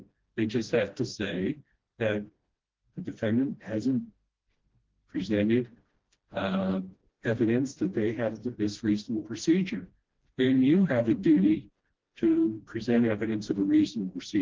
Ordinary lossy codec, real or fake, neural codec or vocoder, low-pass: Opus, 16 kbps; fake; codec, 16 kHz, 1 kbps, FreqCodec, smaller model; 7.2 kHz